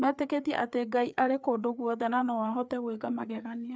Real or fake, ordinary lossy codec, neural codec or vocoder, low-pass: fake; none; codec, 16 kHz, 4 kbps, FreqCodec, larger model; none